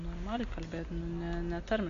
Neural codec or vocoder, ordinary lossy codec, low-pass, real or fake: none; Opus, 64 kbps; 7.2 kHz; real